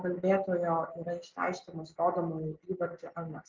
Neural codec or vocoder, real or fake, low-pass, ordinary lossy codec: none; real; 7.2 kHz; Opus, 32 kbps